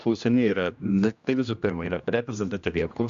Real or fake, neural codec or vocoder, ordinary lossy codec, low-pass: fake; codec, 16 kHz, 1 kbps, X-Codec, HuBERT features, trained on general audio; Opus, 64 kbps; 7.2 kHz